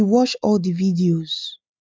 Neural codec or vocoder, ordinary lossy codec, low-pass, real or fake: none; none; none; real